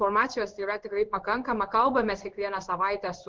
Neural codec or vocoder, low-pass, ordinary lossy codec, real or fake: codec, 16 kHz in and 24 kHz out, 1 kbps, XY-Tokenizer; 7.2 kHz; Opus, 16 kbps; fake